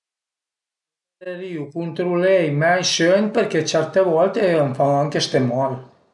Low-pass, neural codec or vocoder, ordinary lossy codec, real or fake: 10.8 kHz; none; none; real